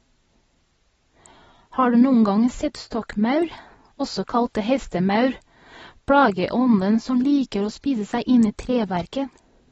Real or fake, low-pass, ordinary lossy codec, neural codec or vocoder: real; 19.8 kHz; AAC, 24 kbps; none